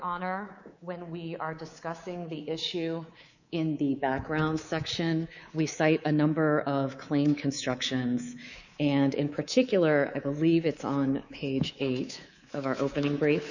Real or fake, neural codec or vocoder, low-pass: fake; codec, 24 kHz, 3.1 kbps, DualCodec; 7.2 kHz